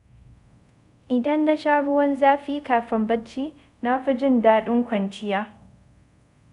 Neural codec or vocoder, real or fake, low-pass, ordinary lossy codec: codec, 24 kHz, 0.5 kbps, DualCodec; fake; 10.8 kHz; none